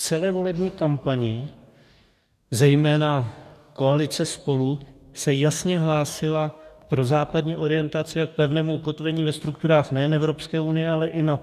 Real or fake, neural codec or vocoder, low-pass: fake; codec, 44.1 kHz, 2.6 kbps, DAC; 14.4 kHz